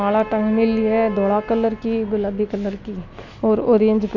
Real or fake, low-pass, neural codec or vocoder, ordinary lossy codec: real; 7.2 kHz; none; none